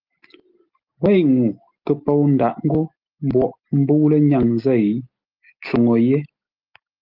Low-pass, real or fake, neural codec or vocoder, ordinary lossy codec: 5.4 kHz; real; none; Opus, 32 kbps